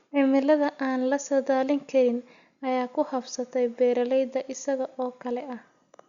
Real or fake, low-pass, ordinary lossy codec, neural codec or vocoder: real; 7.2 kHz; Opus, 64 kbps; none